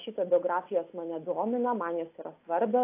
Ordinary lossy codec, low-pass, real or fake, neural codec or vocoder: AAC, 24 kbps; 3.6 kHz; real; none